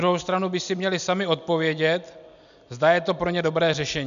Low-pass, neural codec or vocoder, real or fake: 7.2 kHz; none; real